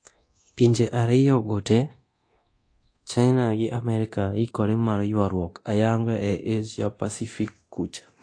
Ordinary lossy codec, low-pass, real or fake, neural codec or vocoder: AAC, 48 kbps; 9.9 kHz; fake; codec, 24 kHz, 0.9 kbps, DualCodec